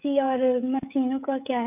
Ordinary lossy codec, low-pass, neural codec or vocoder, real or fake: none; 3.6 kHz; codec, 16 kHz, 16 kbps, FreqCodec, smaller model; fake